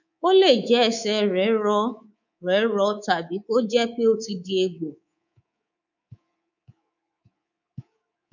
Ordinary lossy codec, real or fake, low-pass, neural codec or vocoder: none; fake; 7.2 kHz; codec, 24 kHz, 3.1 kbps, DualCodec